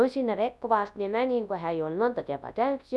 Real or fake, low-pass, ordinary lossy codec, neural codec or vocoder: fake; none; none; codec, 24 kHz, 0.9 kbps, WavTokenizer, large speech release